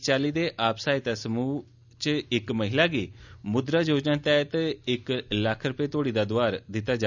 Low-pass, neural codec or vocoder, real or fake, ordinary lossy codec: 7.2 kHz; none; real; none